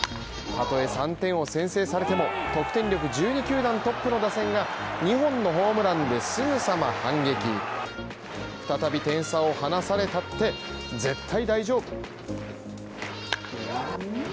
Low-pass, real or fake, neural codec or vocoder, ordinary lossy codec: none; real; none; none